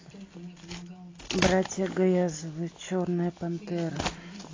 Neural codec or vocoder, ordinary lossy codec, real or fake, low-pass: none; AAC, 32 kbps; real; 7.2 kHz